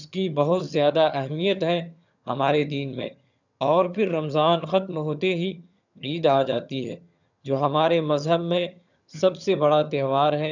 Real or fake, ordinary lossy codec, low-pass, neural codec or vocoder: fake; none; 7.2 kHz; vocoder, 22.05 kHz, 80 mel bands, HiFi-GAN